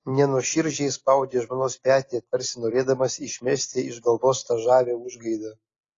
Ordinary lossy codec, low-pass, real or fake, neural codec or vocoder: AAC, 32 kbps; 7.2 kHz; real; none